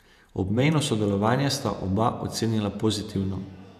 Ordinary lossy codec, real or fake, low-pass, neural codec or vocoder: none; fake; 14.4 kHz; vocoder, 48 kHz, 128 mel bands, Vocos